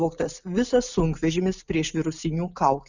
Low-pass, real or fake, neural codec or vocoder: 7.2 kHz; real; none